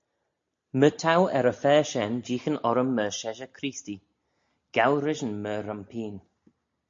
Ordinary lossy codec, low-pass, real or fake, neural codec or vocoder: MP3, 48 kbps; 7.2 kHz; real; none